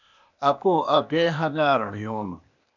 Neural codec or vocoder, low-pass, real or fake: codec, 16 kHz, 0.8 kbps, ZipCodec; 7.2 kHz; fake